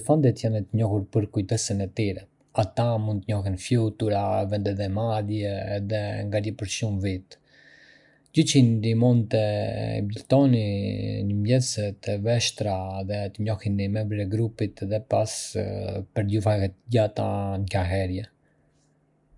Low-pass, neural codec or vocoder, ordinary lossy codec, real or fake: 10.8 kHz; none; none; real